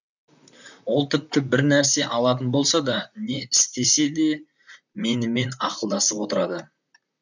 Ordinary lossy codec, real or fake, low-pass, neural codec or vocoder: none; fake; 7.2 kHz; vocoder, 44.1 kHz, 128 mel bands, Pupu-Vocoder